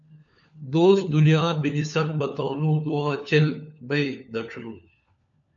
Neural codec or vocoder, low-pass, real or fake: codec, 16 kHz, 4 kbps, FunCodec, trained on LibriTTS, 50 frames a second; 7.2 kHz; fake